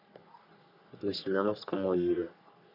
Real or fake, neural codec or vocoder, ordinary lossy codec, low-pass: fake; codec, 44.1 kHz, 3.4 kbps, Pupu-Codec; none; 5.4 kHz